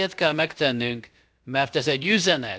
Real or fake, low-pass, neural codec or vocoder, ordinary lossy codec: fake; none; codec, 16 kHz, about 1 kbps, DyCAST, with the encoder's durations; none